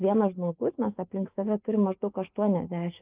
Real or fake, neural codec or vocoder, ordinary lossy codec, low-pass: fake; autoencoder, 48 kHz, 128 numbers a frame, DAC-VAE, trained on Japanese speech; Opus, 24 kbps; 3.6 kHz